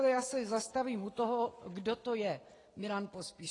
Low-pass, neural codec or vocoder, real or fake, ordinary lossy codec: 10.8 kHz; none; real; AAC, 32 kbps